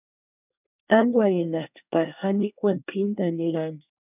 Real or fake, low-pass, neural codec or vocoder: fake; 3.6 kHz; codec, 24 kHz, 1 kbps, SNAC